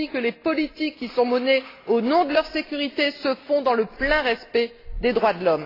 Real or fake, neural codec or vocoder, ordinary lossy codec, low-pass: real; none; AAC, 24 kbps; 5.4 kHz